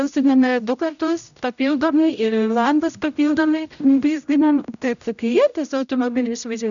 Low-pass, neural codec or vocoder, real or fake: 7.2 kHz; codec, 16 kHz, 0.5 kbps, X-Codec, HuBERT features, trained on general audio; fake